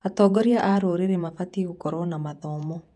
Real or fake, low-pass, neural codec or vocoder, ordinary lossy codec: fake; 10.8 kHz; vocoder, 48 kHz, 128 mel bands, Vocos; none